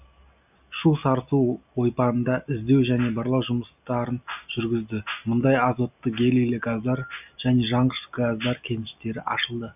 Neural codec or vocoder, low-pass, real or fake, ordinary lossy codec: none; 3.6 kHz; real; none